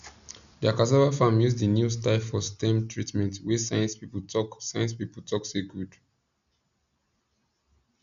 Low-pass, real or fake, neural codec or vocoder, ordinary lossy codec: 7.2 kHz; real; none; none